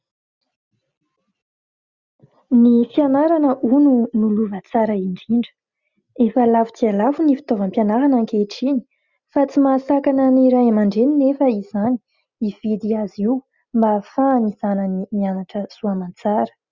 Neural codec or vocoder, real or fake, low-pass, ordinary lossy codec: none; real; 7.2 kHz; Opus, 64 kbps